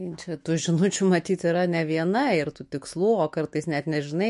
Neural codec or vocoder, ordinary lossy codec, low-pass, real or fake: autoencoder, 48 kHz, 128 numbers a frame, DAC-VAE, trained on Japanese speech; MP3, 48 kbps; 14.4 kHz; fake